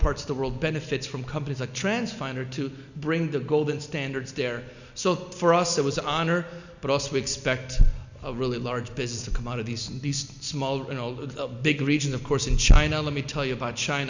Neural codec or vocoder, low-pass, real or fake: none; 7.2 kHz; real